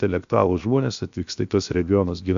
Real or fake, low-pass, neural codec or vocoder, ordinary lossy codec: fake; 7.2 kHz; codec, 16 kHz, 0.7 kbps, FocalCodec; MP3, 64 kbps